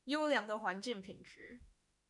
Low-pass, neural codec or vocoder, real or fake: 10.8 kHz; autoencoder, 48 kHz, 32 numbers a frame, DAC-VAE, trained on Japanese speech; fake